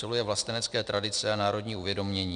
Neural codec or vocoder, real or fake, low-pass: none; real; 9.9 kHz